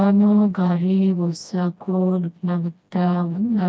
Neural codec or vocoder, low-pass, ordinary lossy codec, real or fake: codec, 16 kHz, 1 kbps, FreqCodec, smaller model; none; none; fake